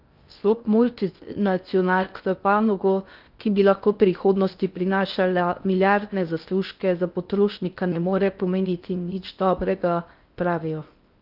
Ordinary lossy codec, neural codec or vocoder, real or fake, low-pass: Opus, 24 kbps; codec, 16 kHz in and 24 kHz out, 0.6 kbps, FocalCodec, streaming, 2048 codes; fake; 5.4 kHz